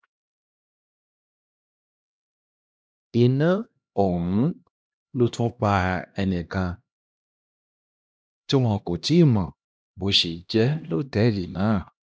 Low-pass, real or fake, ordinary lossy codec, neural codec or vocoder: none; fake; none; codec, 16 kHz, 1 kbps, X-Codec, HuBERT features, trained on LibriSpeech